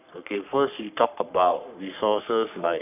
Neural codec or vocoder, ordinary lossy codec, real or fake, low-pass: codec, 44.1 kHz, 3.4 kbps, Pupu-Codec; none; fake; 3.6 kHz